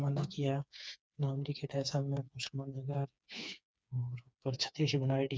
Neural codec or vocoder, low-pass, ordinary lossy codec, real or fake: codec, 16 kHz, 4 kbps, FreqCodec, smaller model; none; none; fake